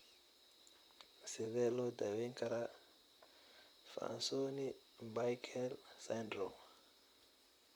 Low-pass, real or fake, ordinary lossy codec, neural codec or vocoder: none; fake; none; vocoder, 44.1 kHz, 128 mel bands, Pupu-Vocoder